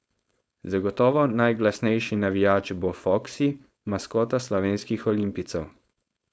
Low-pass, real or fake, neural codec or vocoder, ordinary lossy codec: none; fake; codec, 16 kHz, 4.8 kbps, FACodec; none